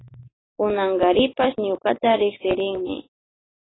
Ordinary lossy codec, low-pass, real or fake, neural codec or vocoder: AAC, 16 kbps; 7.2 kHz; real; none